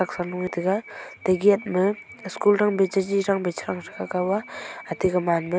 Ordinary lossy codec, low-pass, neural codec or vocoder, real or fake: none; none; none; real